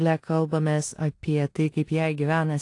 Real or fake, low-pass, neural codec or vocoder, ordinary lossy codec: fake; 10.8 kHz; codec, 16 kHz in and 24 kHz out, 0.9 kbps, LongCat-Audio-Codec, four codebook decoder; AAC, 48 kbps